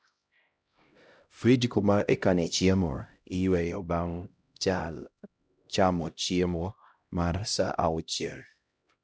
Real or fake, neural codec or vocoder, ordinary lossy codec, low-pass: fake; codec, 16 kHz, 0.5 kbps, X-Codec, HuBERT features, trained on LibriSpeech; none; none